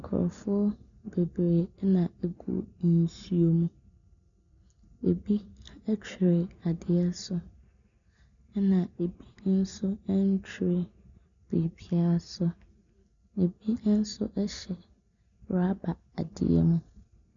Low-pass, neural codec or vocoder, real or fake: 7.2 kHz; none; real